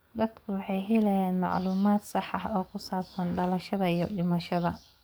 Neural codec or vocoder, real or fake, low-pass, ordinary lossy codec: codec, 44.1 kHz, 7.8 kbps, DAC; fake; none; none